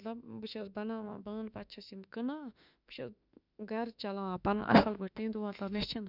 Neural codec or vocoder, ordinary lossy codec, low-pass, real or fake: autoencoder, 48 kHz, 32 numbers a frame, DAC-VAE, trained on Japanese speech; none; 5.4 kHz; fake